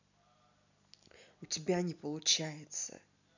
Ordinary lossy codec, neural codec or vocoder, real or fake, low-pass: none; none; real; 7.2 kHz